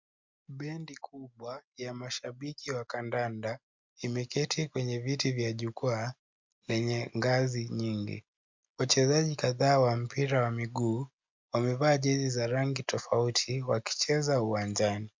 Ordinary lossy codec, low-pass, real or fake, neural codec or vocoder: MP3, 64 kbps; 7.2 kHz; real; none